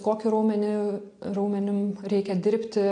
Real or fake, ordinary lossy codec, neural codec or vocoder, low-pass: real; AAC, 48 kbps; none; 9.9 kHz